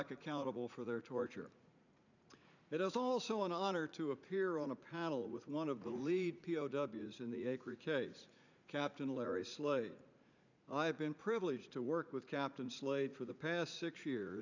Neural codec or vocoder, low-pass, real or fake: vocoder, 44.1 kHz, 80 mel bands, Vocos; 7.2 kHz; fake